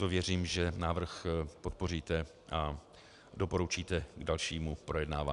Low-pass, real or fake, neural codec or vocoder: 10.8 kHz; real; none